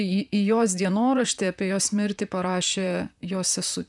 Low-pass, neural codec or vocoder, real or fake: 10.8 kHz; vocoder, 44.1 kHz, 128 mel bands, Pupu-Vocoder; fake